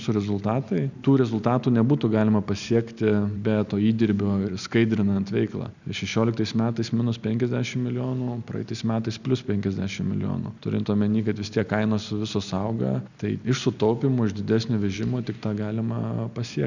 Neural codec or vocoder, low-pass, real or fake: none; 7.2 kHz; real